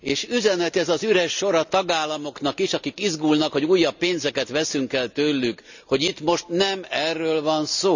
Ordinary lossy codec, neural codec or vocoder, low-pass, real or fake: none; none; 7.2 kHz; real